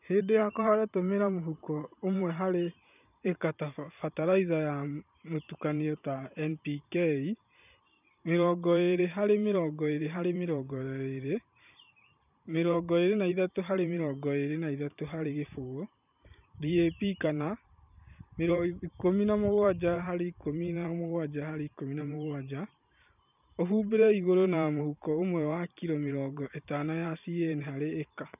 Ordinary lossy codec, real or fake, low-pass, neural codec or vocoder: none; fake; 3.6 kHz; vocoder, 44.1 kHz, 128 mel bands every 512 samples, BigVGAN v2